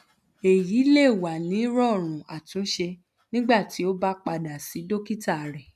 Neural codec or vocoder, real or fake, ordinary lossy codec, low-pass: none; real; none; 14.4 kHz